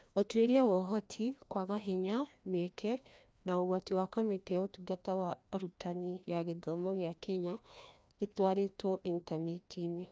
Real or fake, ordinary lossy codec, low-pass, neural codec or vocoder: fake; none; none; codec, 16 kHz, 1 kbps, FreqCodec, larger model